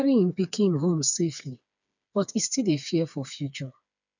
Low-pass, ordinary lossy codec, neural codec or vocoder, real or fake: 7.2 kHz; none; codec, 16 kHz, 4 kbps, FreqCodec, smaller model; fake